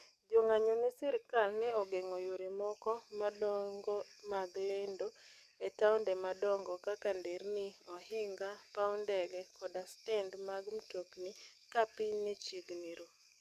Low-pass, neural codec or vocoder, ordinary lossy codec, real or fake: 14.4 kHz; codec, 44.1 kHz, 7.8 kbps, DAC; none; fake